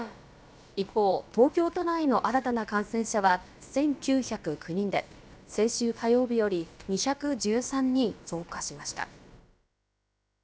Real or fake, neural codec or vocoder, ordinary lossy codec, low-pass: fake; codec, 16 kHz, about 1 kbps, DyCAST, with the encoder's durations; none; none